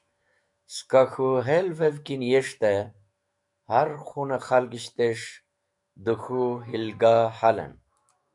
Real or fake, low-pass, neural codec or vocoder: fake; 10.8 kHz; autoencoder, 48 kHz, 128 numbers a frame, DAC-VAE, trained on Japanese speech